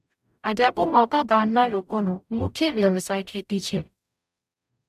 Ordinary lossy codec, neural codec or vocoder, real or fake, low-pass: AAC, 96 kbps; codec, 44.1 kHz, 0.9 kbps, DAC; fake; 14.4 kHz